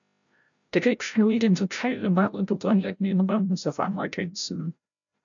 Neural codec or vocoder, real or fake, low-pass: codec, 16 kHz, 0.5 kbps, FreqCodec, larger model; fake; 7.2 kHz